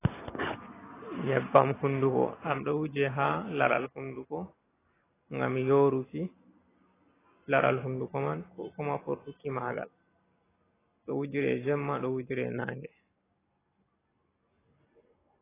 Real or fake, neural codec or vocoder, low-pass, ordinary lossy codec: real; none; 3.6 kHz; AAC, 16 kbps